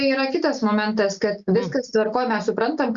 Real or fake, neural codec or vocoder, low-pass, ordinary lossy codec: real; none; 7.2 kHz; Opus, 64 kbps